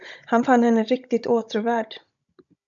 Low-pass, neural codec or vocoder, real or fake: 7.2 kHz; codec, 16 kHz, 16 kbps, FunCodec, trained on Chinese and English, 50 frames a second; fake